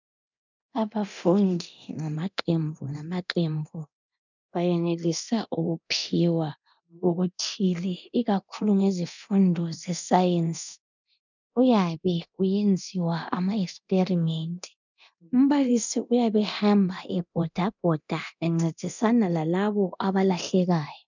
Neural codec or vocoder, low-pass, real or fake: codec, 24 kHz, 0.9 kbps, DualCodec; 7.2 kHz; fake